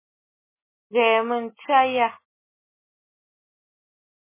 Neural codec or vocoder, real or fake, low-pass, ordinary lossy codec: none; real; 3.6 kHz; MP3, 16 kbps